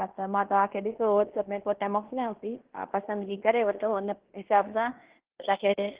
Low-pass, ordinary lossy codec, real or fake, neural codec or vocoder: 3.6 kHz; Opus, 16 kbps; fake; codec, 16 kHz in and 24 kHz out, 0.9 kbps, LongCat-Audio-Codec, fine tuned four codebook decoder